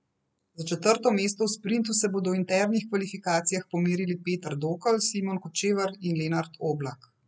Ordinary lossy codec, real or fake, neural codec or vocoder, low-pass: none; real; none; none